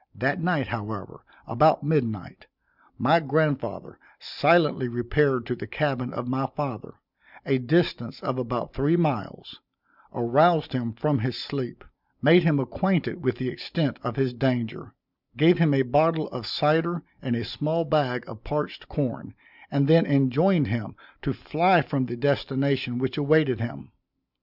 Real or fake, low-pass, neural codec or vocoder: real; 5.4 kHz; none